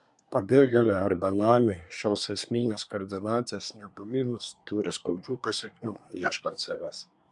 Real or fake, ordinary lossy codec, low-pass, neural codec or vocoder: fake; MP3, 96 kbps; 10.8 kHz; codec, 24 kHz, 1 kbps, SNAC